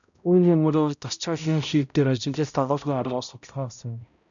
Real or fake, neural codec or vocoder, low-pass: fake; codec, 16 kHz, 0.5 kbps, X-Codec, HuBERT features, trained on balanced general audio; 7.2 kHz